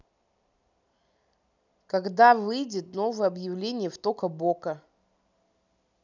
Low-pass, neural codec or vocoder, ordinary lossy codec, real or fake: 7.2 kHz; none; none; real